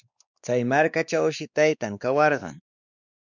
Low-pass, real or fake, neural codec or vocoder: 7.2 kHz; fake; codec, 16 kHz, 2 kbps, X-Codec, WavLM features, trained on Multilingual LibriSpeech